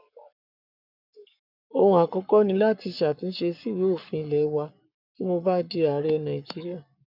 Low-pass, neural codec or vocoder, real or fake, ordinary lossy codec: 5.4 kHz; codec, 44.1 kHz, 7.8 kbps, Pupu-Codec; fake; none